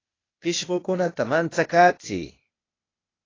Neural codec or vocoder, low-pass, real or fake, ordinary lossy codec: codec, 16 kHz, 0.8 kbps, ZipCodec; 7.2 kHz; fake; AAC, 32 kbps